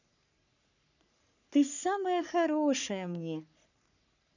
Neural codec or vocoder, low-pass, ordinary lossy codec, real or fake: codec, 44.1 kHz, 3.4 kbps, Pupu-Codec; 7.2 kHz; none; fake